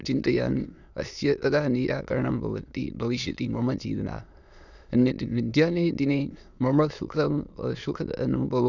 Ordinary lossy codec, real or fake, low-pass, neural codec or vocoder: none; fake; 7.2 kHz; autoencoder, 22.05 kHz, a latent of 192 numbers a frame, VITS, trained on many speakers